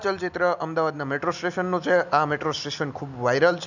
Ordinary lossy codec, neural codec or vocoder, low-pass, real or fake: none; none; 7.2 kHz; real